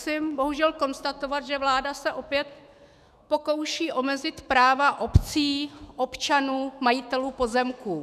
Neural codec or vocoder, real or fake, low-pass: autoencoder, 48 kHz, 128 numbers a frame, DAC-VAE, trained on Japanese speech; fake; 14.4 kHz